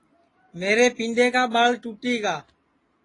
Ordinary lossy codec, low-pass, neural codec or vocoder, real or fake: AAC, 32 kbps; 10.8 kHz; none; real